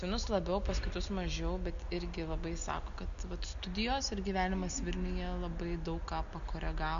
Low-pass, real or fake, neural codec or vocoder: 7.2 kHz; real; none